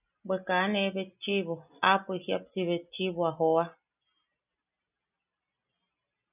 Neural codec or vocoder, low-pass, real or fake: none; 3.6 kHz; real